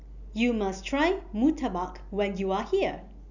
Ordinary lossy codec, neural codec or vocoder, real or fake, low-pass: none; none; real; 7.2 kHz